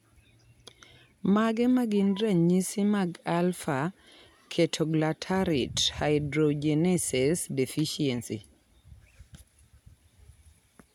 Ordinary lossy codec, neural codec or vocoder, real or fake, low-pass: none; none; real; 19.8 kHz